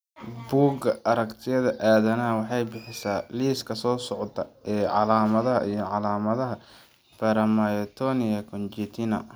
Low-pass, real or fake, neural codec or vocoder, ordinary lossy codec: none; real; none; none